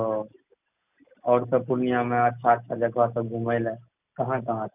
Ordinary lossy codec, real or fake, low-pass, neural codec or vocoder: none; real; 3.6 kHz; none